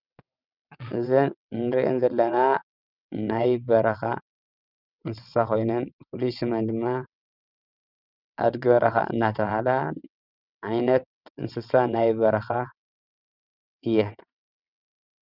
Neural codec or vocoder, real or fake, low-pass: vocoder, 22.05 kHz, 80 mel bands, WaveNeXt; fake; 5.4 kHz